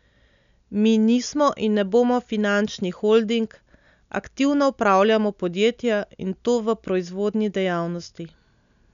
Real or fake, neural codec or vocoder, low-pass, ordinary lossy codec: real; none; 7.2 kHz; none